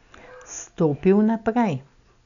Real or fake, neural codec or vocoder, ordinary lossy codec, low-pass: real; none; none; 7.2 kHz